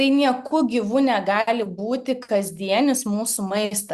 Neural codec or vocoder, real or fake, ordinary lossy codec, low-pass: none; real; Opus, 64 kbps; 14.4 kHz